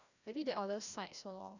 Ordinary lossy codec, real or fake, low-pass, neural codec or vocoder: none; fake; 7.2 kHz; codec, 16 kHz, 1 kbps, FreqCodec, larger model